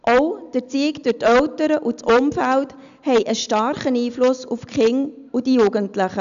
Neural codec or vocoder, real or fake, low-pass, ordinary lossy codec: none; real; 7.2 kHz; AAC, 96 kbps